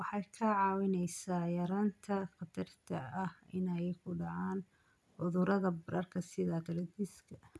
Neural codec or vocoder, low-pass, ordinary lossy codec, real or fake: none; none; none; real